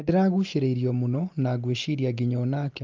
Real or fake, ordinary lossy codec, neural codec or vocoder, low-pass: real; Opus, 24 kbps; none; 7.2 kHz